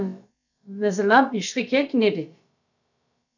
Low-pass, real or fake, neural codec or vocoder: 7.2 kHz; fake; codec, 16 kHz, about 1 kbps, DyCAST, with the encoder's durations